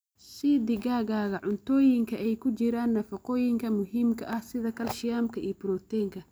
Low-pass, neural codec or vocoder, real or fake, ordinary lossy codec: none; none; real; none